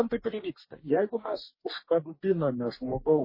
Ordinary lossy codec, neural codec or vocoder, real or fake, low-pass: MP3, 24 kbps; codec, 44.1 kHz, 2.6 kbps, DAC; fake; 5.4 kHz